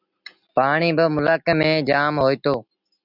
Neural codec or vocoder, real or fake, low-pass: none; real; 5.4 kHz